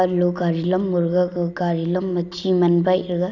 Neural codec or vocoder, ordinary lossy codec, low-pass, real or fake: none; none; 7.2 kHz; real